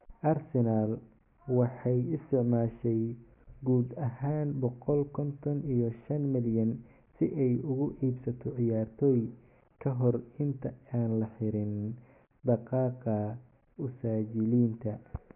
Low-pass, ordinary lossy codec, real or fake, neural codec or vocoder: 3.6 kHz; none; real; none